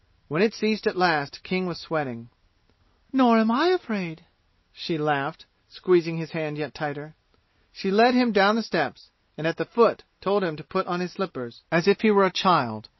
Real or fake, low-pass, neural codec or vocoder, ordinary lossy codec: real; 7.2 kHz; none; MP3, 24 kbps